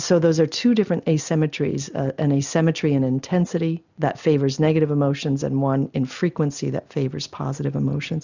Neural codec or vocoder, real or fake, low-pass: none; real; 7.2 kHz